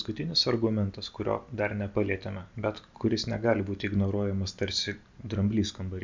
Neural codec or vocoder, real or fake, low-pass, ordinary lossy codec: none; real; 7.2 kHz; MP3, 64 kbps